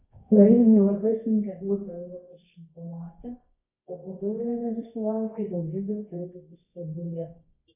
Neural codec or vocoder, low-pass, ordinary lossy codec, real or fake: codec, 24 kHz, 0.9 kbps, WavTokenizer, medium music audio release; 3.6 kHz; AAC, 32 kbps; fake